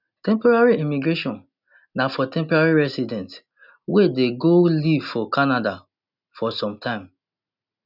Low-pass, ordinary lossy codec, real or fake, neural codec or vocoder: 5.4 kHz; none; real; none